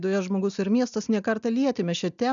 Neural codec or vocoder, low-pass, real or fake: none; 7.2 kHz; real